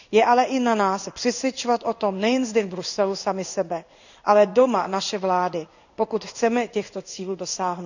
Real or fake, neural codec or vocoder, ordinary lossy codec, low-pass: fake; codec, 16 kHz in and 24 kHz out, 1 kbps, XY-Tokenizer; none; 7.2 kHz